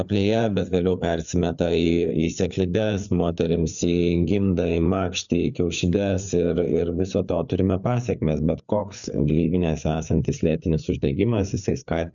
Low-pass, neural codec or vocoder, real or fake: 7.2 kHz; codec, 16 kHz, 4 kbps, FreqCodec, larger model; fake